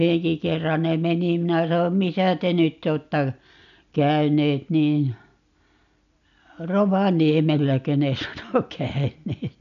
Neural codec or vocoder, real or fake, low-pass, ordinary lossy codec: none; real; 7.2 kHz; none